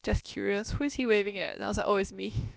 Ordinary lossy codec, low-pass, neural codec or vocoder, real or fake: none; none; codec, 16 kHz, about 1 kbps, DyCAST, with the encoder's durations; fake